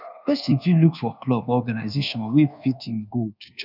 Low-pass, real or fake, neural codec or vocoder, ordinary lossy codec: 5.4 kHz; fake; codec, 24 kHz, 1.2 kbps, DualCodec; none